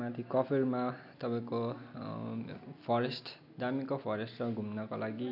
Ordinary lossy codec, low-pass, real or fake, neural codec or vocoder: none; 5.4 kHz; real; none